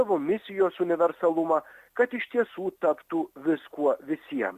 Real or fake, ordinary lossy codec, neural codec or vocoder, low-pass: real; Opus, 64 kbps; none; 14.4 kHz